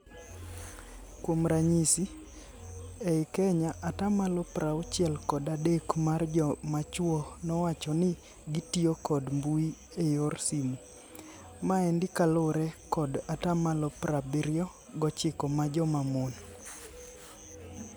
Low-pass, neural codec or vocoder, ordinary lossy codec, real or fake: none; none; none; real